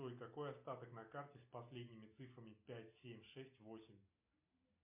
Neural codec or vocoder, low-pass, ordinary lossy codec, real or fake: none; 3.6 kHz; MP3, 32 kbps; real